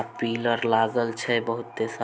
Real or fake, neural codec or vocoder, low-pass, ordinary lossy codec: real; none; none; none